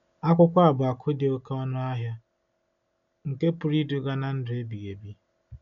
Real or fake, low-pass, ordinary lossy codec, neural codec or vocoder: real; 7.2 kHz; none; none